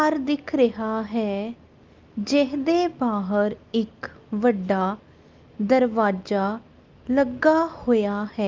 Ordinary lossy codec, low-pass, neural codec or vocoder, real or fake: Opus, 24 kbps; 7.2 kHz; none; real